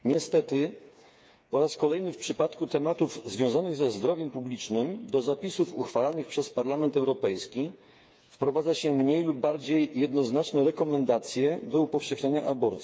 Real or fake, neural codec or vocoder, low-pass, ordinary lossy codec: fake; codec, 16 kHz, 4 kbps, FreqCodec, smaller model; none; none